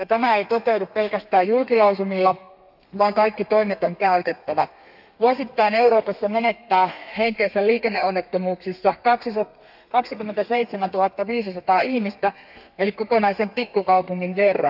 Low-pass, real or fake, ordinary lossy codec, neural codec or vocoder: 5.4 kHz; fake; AAC, 48 kbps; codec, 32 kHz, 1.9 kbps, SNAC